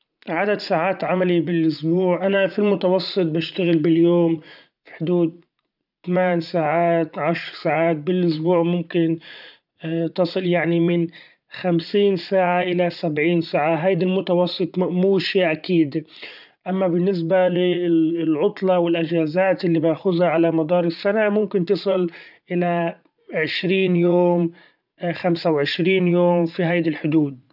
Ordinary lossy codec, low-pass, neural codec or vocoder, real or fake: none; 5.4 kHz; vocoder, 44.1 kHz, 80 mel bands, Vocos; fake